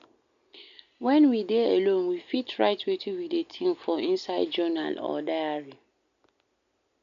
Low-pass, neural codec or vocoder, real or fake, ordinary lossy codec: 7.2 kHz; none; real; none